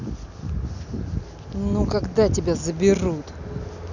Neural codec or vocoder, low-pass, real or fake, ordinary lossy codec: none; 7.2 kHz; real; none